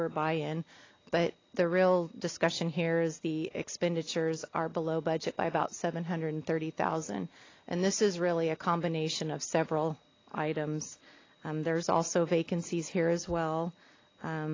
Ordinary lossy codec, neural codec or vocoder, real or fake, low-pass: AAC, 32 kbps; none; real; 7.2 kHz